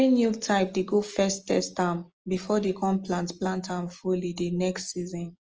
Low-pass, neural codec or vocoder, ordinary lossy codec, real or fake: 7.2 kHz; none; Opus, 24 kbps; real